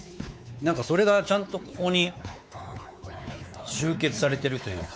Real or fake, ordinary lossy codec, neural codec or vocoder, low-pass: fake; none; codec, 16 kHz, 4 kbps, X-Codec, WavLM features, trained on Multilingual LibriSpeech; none